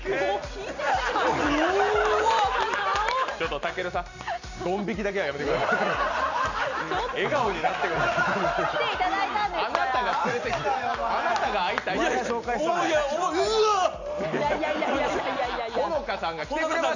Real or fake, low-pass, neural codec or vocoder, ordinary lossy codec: real; 7.2 kHz; none; none